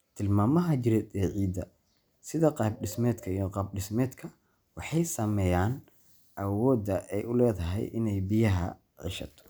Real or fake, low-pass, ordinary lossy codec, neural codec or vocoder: real; none; none; none